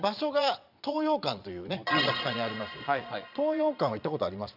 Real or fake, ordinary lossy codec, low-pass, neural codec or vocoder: fake; none; 5.4 kHz; vocoder, 22.05 kHz, 80 mel bands, Vocos